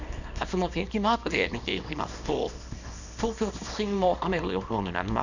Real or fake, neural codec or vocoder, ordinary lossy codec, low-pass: fake; codec, 24 kHz, 0.9 kbps, WavTokenizer, small release; none; 7.2 kHz